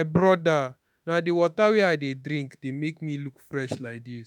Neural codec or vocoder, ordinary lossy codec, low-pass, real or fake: autoencoder, 48 kHz, 32 numbers a frame, DAC-VAE, trained on Japanese speech; none; 19.8 kHz; fake